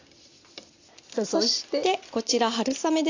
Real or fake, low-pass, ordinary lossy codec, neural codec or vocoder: real; 7.2 kHz; AAC, 48 kbps; none